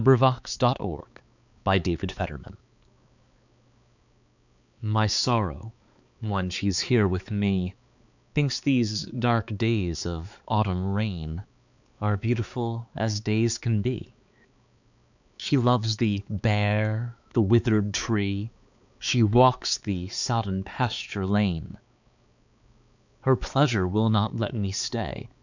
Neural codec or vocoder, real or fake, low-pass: codec, 16 kHz, 4 kbps, X-Codec, HuBERT features, trained on balanced general audio; fake; 7.2 kHz